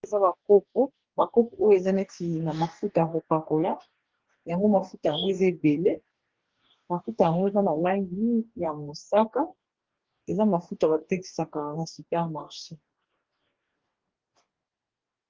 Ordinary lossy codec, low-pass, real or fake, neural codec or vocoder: Opus, 16 kbps; 7.2 kHz; fake; codec, 44.1 kHz, 2.6 kbps, DAC